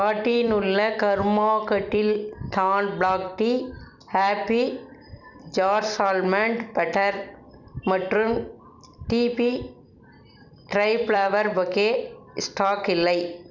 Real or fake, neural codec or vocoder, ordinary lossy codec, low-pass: fake; vocoder, 44.1 kHz, 128 mel bands every 256 samples, BigVGAN v2; none; 7.2 kHz